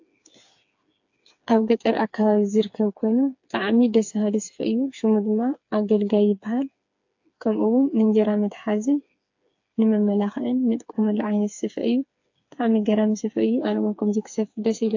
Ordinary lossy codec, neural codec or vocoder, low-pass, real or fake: AAC, 48 kbps; codec, 16 kHz, 4 kbps, FreqCodec, smaller model; 7.2 kHz; fake